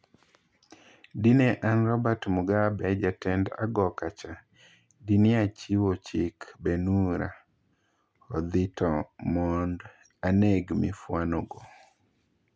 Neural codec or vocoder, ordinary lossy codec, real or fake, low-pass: none; none; real; none